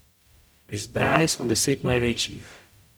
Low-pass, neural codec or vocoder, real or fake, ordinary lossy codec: none; codec, 44.1 kHz, 0.9 kbps, DAC; fake; none